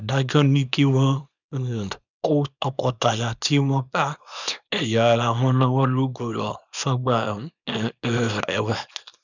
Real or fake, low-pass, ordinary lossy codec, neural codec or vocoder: fake; 7.2 kHz; none; codec, 24 kHz, 0.9 kbps, WavTokenizer, small release